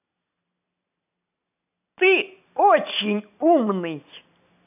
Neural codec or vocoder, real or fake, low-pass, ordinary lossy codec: none; real; 3.6 kHz; none